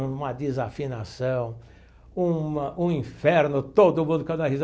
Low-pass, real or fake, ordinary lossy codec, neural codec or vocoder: none; real; none; none